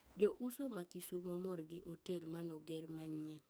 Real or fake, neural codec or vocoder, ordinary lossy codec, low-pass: fake; codec, 44.1 kHz, 2.6 kbps, SNAC; none; none